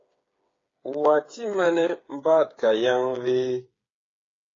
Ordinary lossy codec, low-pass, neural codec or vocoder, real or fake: AAC, 32 kbps; 7.2 kHz; codec, 16 kHz, 8 kbps, FreqCodec, smaller model; fake